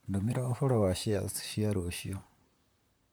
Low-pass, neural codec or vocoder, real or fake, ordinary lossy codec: none; vocoder, 44.1 kHz, 128 mel bands, Pupu-Vocoder; fake; none